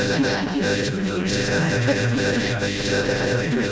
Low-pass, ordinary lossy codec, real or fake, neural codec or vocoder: none; none; fake; codec, 16 kHz, 0.5 kbps, FreqCodec, smaller model